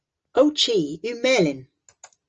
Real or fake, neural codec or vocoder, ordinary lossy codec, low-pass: real; none; Opus, 24 kbps; 7.2 kHz